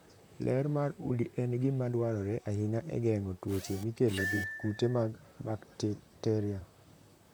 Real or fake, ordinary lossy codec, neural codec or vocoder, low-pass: fake; none; vocoder, 44.1 kHz, 128 mel bands, Pupu-Vocoder; none